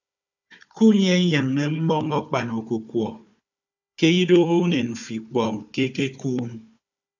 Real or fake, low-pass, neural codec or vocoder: fake; 7.2 kHz; codec, 16 kHz, 4 kbps, FunCodec, trained on Chinese and English, 50 frames a second